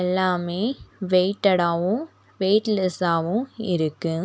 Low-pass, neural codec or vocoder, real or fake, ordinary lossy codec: none; none; real; none